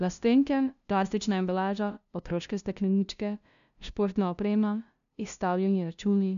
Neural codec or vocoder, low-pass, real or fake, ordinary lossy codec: codec, 16 kHz, 0.5 kbps, FunCodec, trained on LibriTTS, 25 frames a second; 7.2 kHz; fake; none